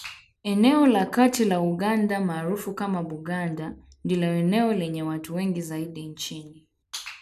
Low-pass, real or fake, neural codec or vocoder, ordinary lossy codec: 14.4 kHz; real; none; none